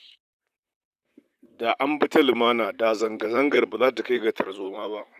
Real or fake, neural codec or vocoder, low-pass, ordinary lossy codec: fake; vocoder, 44.1 kHz, 128 mel bands, Pupu-Vocoder; 14.4 kHz; none